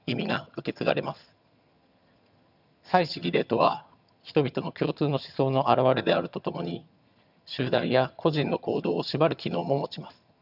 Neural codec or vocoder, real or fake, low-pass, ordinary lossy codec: vocoder, 22.05 kHz, 80 mel bands, HiFi-GAN; fake; 5.4 kHz; none